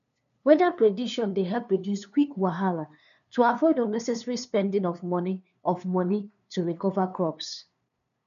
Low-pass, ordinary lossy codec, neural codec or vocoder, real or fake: 7.2 kHz; none; codec, 16 kHz, 2 kbps, FunCodec, trained on LibriTTS, 25 frames a second; fake